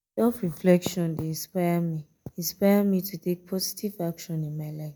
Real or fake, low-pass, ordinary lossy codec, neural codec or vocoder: real; none; none; none